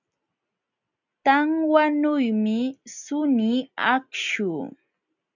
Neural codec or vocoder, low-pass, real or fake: none; 7.2 kHz; real